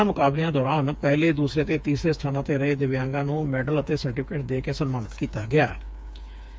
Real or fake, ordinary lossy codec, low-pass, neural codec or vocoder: fake; none; none; codec, 16 kHz, 4 kbps, FreqCodec, smaller model